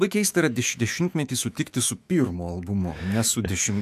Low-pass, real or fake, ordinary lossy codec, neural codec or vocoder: 14.4 kHz; fake; AAC, 96 kbps; autoencoder, 48 kHz, 128 numbers a frame, DAC-VAE, trained on Japanese speech